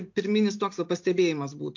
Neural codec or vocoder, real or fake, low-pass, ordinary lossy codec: none; real; 7.2 kHz; MP3, 48 kbps